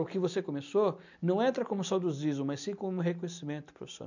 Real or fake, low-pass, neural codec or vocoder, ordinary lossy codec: real; 7.2 kHz; none; none